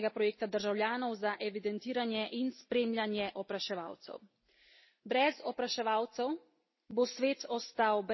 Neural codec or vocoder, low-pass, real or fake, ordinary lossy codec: none; 7.2 kHz; real; MP3, 24 kbps